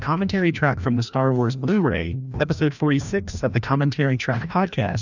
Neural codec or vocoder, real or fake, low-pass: codec, 16 kHz, 1 kbps, FreqCodec, larger model; fake; 7.2 kHz